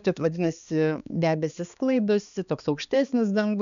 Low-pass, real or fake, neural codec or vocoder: 7.2 kHz; fake; codec, 16 kHz, 2 kbps, X-Codec, HuBERT features, trained on balanced general audio